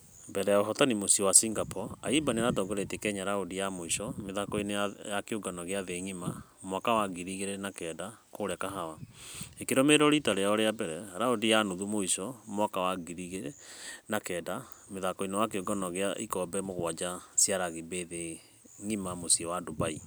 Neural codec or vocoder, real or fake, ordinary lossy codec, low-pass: none; real; none; none